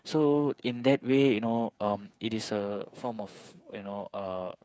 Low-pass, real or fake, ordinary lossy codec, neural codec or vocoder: none; fake; none; codec, 16 kHz, 8 kbps, FreqCodec, smaller model